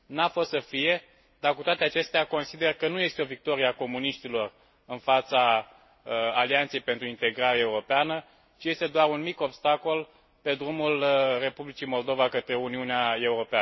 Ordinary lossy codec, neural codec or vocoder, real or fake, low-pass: MP3, 24 kbps; none; real; 7.2 kHz